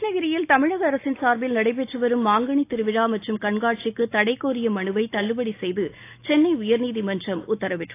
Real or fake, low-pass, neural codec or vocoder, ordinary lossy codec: real; 3.6 kHz; none; AAC, 24 kbps